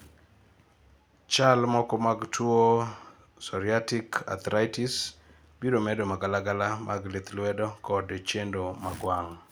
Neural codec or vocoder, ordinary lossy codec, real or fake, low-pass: none; none; real; none